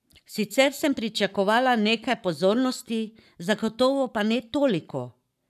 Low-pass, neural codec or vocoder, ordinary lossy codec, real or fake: 14.4 kHz; codec, 44.1 kHz, 7.8 kbps, Pupu-Codec; none; fake